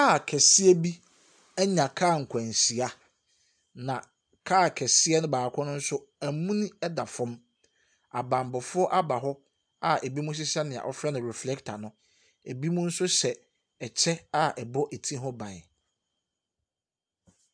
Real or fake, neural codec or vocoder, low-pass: real; none; 9.9 kHz